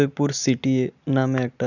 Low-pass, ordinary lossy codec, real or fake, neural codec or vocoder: 7.2 kHz; none; real; none